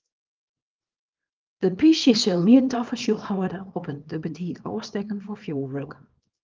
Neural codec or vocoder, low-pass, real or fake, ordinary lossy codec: codec, 24 kHz, 0.9 kbps, WavTokenizer, small release; 7.2 kHz; fake; Opus, 32 kbps